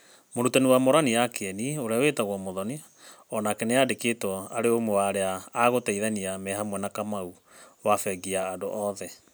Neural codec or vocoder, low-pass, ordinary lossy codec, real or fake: none; none; none; real